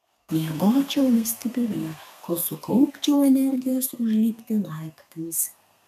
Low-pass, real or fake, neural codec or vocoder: 14.4 kHz; fake; codec, 32 kHz, 1.9 kbps, SNAC